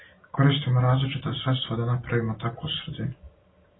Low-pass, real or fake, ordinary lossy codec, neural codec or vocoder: 7.2 kHz; real; AAC, 16 kbps; none